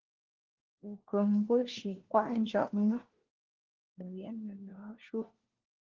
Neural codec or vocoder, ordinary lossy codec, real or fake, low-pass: codec, 16 kHz, 1 kbps, FunCodec, trained on LibriTTS, 50 frames a second; Opus, 16 kbps; fake; 7.2 kHz